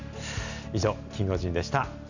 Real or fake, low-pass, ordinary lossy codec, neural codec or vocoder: real; 7.2 kHz; none; none